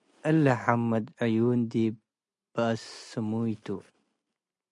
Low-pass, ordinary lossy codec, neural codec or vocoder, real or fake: 10.8 kHz; MP3, 64 kbps; none; real